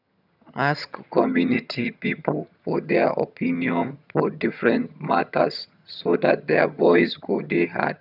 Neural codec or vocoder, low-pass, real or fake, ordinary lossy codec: vocoder, 22.05 kHz, 80 mel bands, HiFi-GAN; 5.4 kHz; fake; none